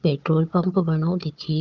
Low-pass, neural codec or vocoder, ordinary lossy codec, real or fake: 7.2 kHz; codec, 16 kHz, 4 kbps, FunCodec, trained on Chinese and English, 50 frames a second; Opus, 24 kbps; fake